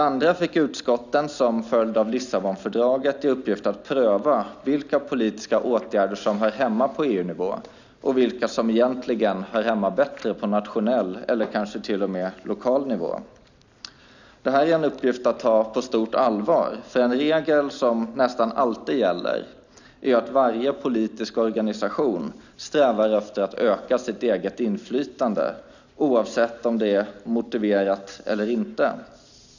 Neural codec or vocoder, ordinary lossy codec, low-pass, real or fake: none; none; 7.2 kHz; real